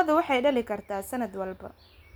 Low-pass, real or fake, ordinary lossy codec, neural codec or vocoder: none; real; none; none